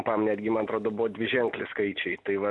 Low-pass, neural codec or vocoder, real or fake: 10.8 kHz; none; real